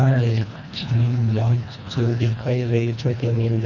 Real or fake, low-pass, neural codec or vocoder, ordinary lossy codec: fake; 7.2 kHz; codec, 24 kHz, 1.5 kbps, HILCodec; none